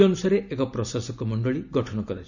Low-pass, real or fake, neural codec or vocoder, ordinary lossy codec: 7.2 kHz; real; none; none